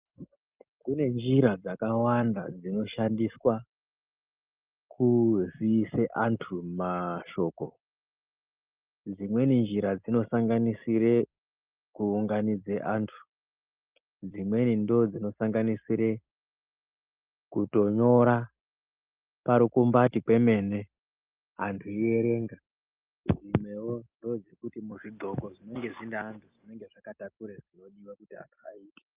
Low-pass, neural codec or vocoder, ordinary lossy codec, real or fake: 3.6 kHz; none; Opus, 24 kbps; real